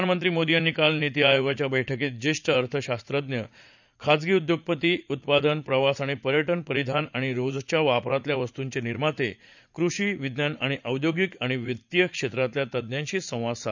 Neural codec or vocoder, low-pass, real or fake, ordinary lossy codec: vocoder, 44.1 kHz, 80 mel bands, Vocos; 7.2 kHz; fake; none